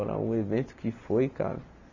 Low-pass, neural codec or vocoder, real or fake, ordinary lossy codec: 7.2 kHz; none; real; none